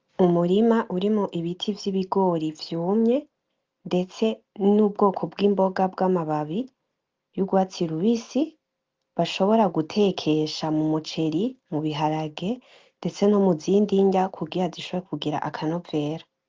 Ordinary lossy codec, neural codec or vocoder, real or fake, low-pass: Opus, 32 kbps; none; real; 7.2 kHz